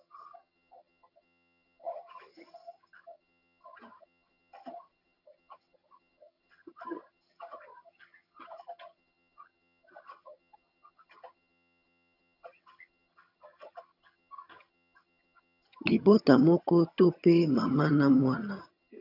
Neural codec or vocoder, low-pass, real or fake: vocoder, 22.05 kHz, 80 mel bands, HiFi-GAN; 5.4 kHz; fake